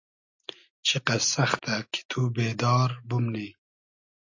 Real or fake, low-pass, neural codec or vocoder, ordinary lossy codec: real; 7.2 kHz; none; AAC, 48 kbps